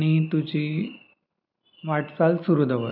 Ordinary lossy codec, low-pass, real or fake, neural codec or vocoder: none; 5.4 kHz; real; none